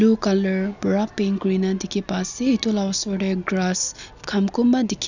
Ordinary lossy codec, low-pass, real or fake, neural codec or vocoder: none; 7.2 kHz; real; none